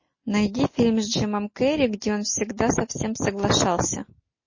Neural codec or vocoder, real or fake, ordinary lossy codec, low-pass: none; real; MP3, 32 kbps; 7.2 kHz